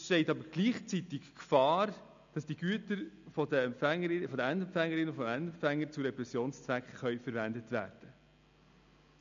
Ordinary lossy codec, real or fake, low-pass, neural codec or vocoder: MP3, 64 kbps; real; 7.2 kHz; none